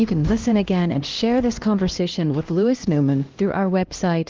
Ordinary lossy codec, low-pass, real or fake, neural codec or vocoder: Opus, 16 kbps; 7.2 kHz; fake; codec, 16 kHz, 1 kbps, X-Codec, HuBERT features, trained on LibriSpeech